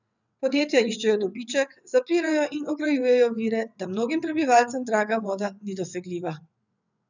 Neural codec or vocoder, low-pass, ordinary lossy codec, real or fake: vocoder, 22.05 kHz, 80 mel bands, WaveNeXt; 7.2 kHz; none; fake